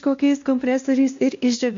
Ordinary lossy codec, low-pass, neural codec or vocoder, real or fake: MP3, 48 kbps; 7.2 kHz; codec, 16 kHz, 1 kbps, X-Codec, WavLM features, trained on Multilingual LibriSpeech; fake